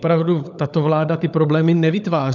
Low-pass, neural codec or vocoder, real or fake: 7.2 kHz; codec, 16 kHz, 16 kbps, FunCodec, trained on LibriTTS, 50 frames a second; fake